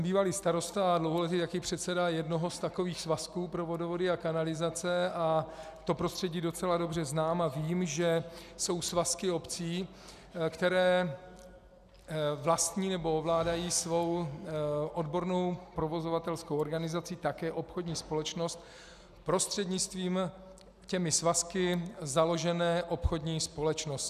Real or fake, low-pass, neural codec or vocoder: real; 14.4 kHz; none